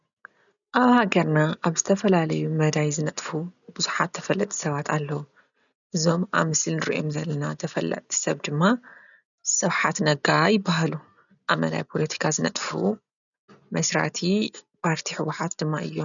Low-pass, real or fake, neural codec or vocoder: 7.2 kHz; real; none